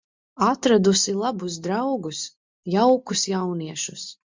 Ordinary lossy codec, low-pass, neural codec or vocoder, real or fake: MP3, 64 kbps; 7.2 kHz; none; real